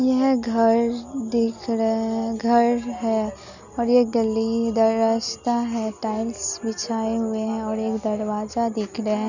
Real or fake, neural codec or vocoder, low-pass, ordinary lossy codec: real; none; 7.2 kHz; none